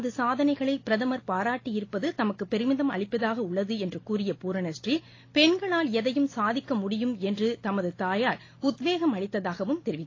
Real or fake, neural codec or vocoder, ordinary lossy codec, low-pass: real; none; AAC, 32 kbps; 7.2 kHz